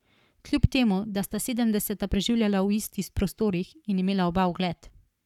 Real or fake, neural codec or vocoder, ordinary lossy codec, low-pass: fake; codec, 44.1 kHz, 7.8 kbps, Pupu-Codec; none; 19.8 kHz